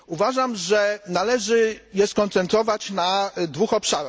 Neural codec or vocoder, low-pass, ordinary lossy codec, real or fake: none; none; none; real